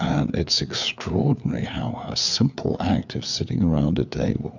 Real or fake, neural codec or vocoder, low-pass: fake; codec, 16 kHz, 4 kbps, FreqCodec, larger model; 7.2 kHz